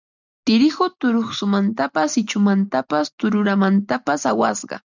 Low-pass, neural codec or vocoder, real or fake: 7.2 kHz; none; real